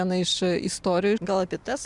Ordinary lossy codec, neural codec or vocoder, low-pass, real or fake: MP3, 96 kbps; vocoder, 44.1 kHz, 128 mel bands every 256 samples, BigVGAN v2; 10.8 kHz; fake